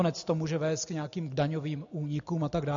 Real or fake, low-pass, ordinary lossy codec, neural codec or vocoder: real; 7.2 kHz; AAC, 64 kbps; none